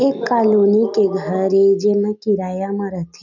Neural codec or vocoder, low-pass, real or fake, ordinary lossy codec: none; 7.2 kHz; real; none